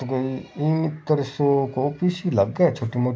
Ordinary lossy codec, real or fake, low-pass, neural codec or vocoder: none; real; none; none